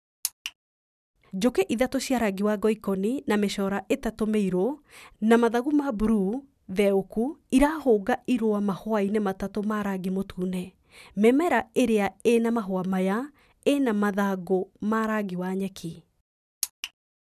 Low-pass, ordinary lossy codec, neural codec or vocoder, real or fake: 14.4 kHz; none; none; real